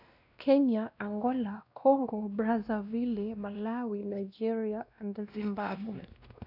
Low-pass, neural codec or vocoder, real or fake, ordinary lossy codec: 5.4 kHz; codec, 16 kHz, 1 kbps, X-Codec, WavLM features, trained on Multilingual LibriSpeech; fake; none